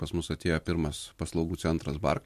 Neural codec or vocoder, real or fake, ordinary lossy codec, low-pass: none; real; MP3, 64 kbps; 14.4 kHz